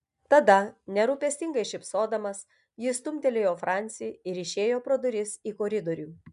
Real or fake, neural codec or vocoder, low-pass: real; none; 10.8 kHz